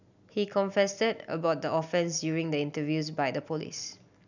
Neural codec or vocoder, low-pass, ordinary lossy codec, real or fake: none; 7.2 kHz; none; real